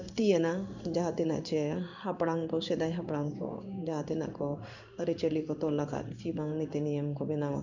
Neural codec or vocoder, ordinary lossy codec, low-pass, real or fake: codec, 16 kHz in and 24 kHz out, 1 kbps, XY-Tokenizer; none; 7.2 kHz; fake